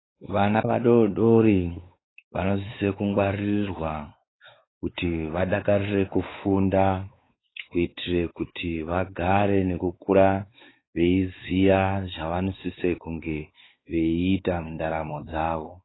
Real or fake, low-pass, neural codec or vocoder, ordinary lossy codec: fake; 7.2 kHz; codec, 16 kHz, 4 kbps, X-Codec, WavLM features, trained on Multilingual LibriSpeech; AAC, 16 kbps